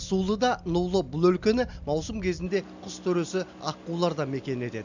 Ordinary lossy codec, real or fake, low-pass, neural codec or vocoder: none; real; 7.2 kHz; none